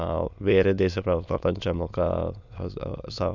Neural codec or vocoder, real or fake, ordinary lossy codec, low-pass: autoencoder, 22.05 kHz, a latent of 192 numbers a frame, VITS, trained on many speakers; fake; Opus, 64 kbps; 7.2 kHz